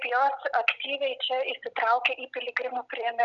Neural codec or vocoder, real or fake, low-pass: none; real; 7.2 kHz